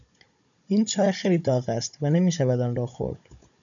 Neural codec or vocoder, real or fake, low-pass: codec, 16 kHz, 16 kbps, FunCodec, trained on Chinese and English, 50 frames a second; fake; 7.2 kHz